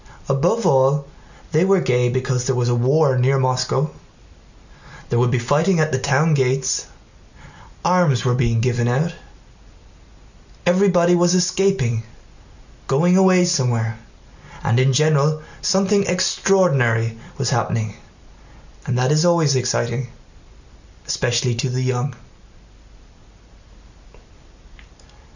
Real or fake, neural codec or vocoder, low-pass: real; none; 7.2 kHz